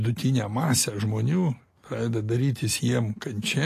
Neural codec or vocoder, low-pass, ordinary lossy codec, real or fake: none; 14.4 kHz; AAC, 48 kbps; real